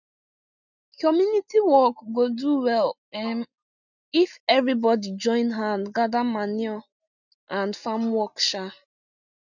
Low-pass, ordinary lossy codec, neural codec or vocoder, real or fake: 7.2 kHz; none; none; real